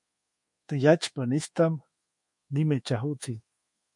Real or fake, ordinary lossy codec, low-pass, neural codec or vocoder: fake; MP3, 48 kbps; 10.8 kHz; codec, 24 kHz, 1.2 kbps, DualCodec